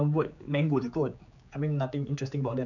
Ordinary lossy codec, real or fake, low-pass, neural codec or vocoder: none; fake; 7.2 kHz; codec, 16 kHz, 4 kbps, X-Codec, HuBERT features, trained on general audio